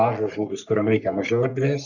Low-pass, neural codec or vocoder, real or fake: 7.2 kHz; codec, 44.1 kHz, 3.4 kbps, Pupu-Codec; fake